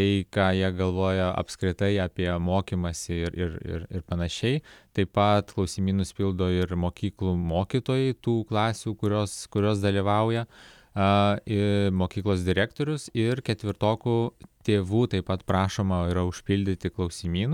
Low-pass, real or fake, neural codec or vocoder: 19.8 kHz; real; none